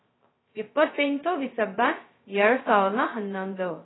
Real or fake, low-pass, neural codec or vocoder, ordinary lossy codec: fake; 7.2 kHz; codec, 16 kHz, 0.2 kbps, FocalCodec; AAC, 16 kbps